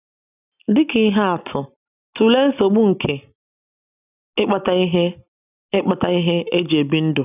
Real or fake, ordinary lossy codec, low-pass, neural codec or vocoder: real; none; 3.6 kHz; none